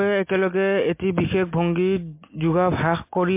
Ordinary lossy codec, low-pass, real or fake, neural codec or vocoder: MP3, 24 kbps; 3.6 kHz; real; none